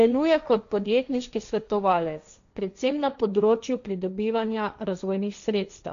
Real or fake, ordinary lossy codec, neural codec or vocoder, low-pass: fake; none; codec, 16 kHz, 1.1 kbps, Voila-Tokenizer; 7.2 kHz